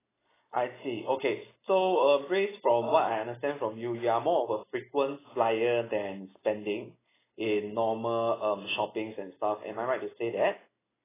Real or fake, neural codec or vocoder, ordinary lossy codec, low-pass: real; none; AAC, 16 kbps; 3.6 kHz